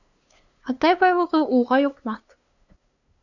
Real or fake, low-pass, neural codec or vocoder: fake; 7.2 kHz; codec, 16 kHz, 2 kbps, FunCodec, trained on LibriTTS, 25 frames a second